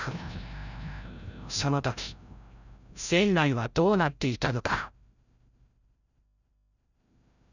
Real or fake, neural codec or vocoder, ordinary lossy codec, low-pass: fake; codec, 16 kHz, 0.5 kbps, FreqCodec, larger model; none; 7.2 kHz